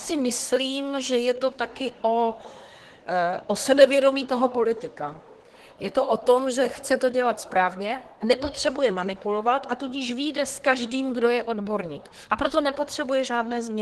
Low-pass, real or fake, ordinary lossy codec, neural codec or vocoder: 10.8 kHz; fake; Opus, 16 kbps; codec, 24 kHz, 1 kbps, SNAC